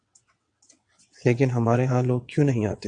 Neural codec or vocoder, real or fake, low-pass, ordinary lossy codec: vocoder, 22.05 kHz, 80 mel bands, WaveNeXt; fake; 9.9 kHz; AAC, 64 kbps